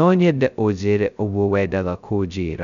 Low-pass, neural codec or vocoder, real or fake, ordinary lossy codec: 7.2 kHz; codec, 16 kHz, 0.2 kbps, FocalCodec; fake; none